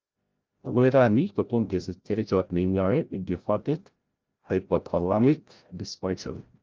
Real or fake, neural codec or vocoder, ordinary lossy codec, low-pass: fake; codec, 16 kHz, 0.5 kbps, FreqCodec, larger model; Opus, 24 kbps; 7.2 kHz